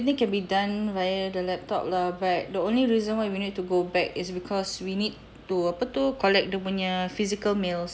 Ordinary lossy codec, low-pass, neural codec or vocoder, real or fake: none; none; none; real